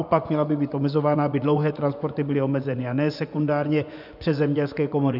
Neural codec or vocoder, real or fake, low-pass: vocoder, 24 kHz, 100 mel bands, Vocos; fake; 5.4 kHz